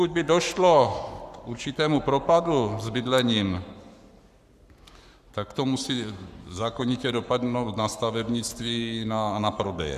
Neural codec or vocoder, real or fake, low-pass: codec, 44.1 kHz, 7.8 kbps, Pupu-Codec; fake; 14.4 kHz